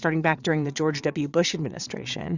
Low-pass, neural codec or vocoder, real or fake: 7.2 kHz; vocoder, 22.05 kHz, 80 mel bands, Vocos; fake